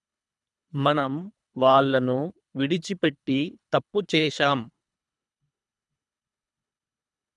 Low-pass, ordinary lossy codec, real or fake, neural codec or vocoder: 10.8 kHz; none; fake; codec, 24 kHz, 3 kbps, HILCodec